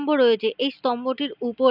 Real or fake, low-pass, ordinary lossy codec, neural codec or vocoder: real; 5.4 kHz; none; none